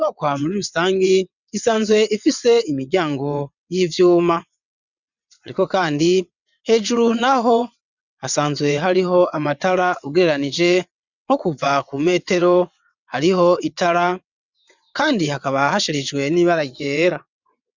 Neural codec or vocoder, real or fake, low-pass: vocoder, 22.05 kHz, 80 mel bands, WaveNeXt; fake; 7.2 kHz